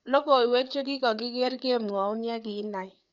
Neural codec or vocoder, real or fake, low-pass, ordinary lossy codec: codec, 16 kHz, 4 kbps, FreqCodec, larger model; fake; 7.2 kHz; none